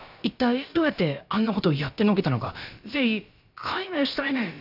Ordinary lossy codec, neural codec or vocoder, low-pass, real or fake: none; codec, 16 kHz, about 1 kbps, DyCAST, with the encoder's durations; 5.4 kHz; fake